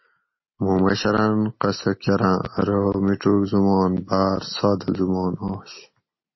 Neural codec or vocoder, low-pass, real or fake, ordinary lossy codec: none; 7.2 kHz; real; MP3, 24 kbps